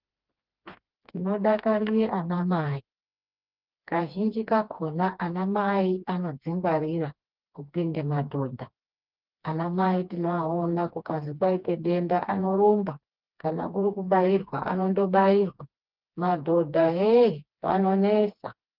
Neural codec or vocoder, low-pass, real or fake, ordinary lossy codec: codec, 16 kHz, 2 kbps, FreqCodec, smaller model; 5.4 kHz; fake; Opus, 24 kbps